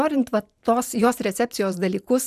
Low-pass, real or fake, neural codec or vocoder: 14.4 kHz; fake; vocoder, 44.1 kHz, 128 mel bands every 512 samples, BigVGAN v2